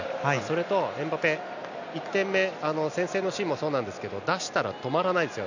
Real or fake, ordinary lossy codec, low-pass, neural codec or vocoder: real; none; 7.2 kHz; none